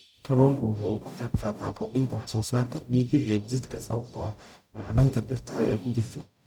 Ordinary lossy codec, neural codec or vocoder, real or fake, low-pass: none; codec, 44.1 kHz, 0.9 kbps, DAC; fake; 19.8 kHz